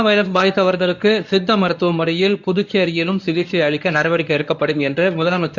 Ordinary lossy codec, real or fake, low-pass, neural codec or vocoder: none; fake; 7.2 kHz; codec, 24 kHz, 0.9 kbps, WavTokenizer, medium speech release version 1